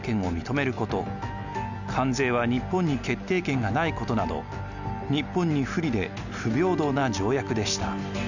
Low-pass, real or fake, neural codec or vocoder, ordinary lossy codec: 7.2 kHz; real; none; none